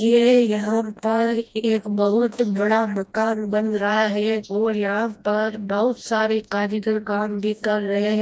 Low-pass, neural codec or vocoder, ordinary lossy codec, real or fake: none; codec, 16 kHz, 1 kbps, FreqCodec, smaller model; none; fake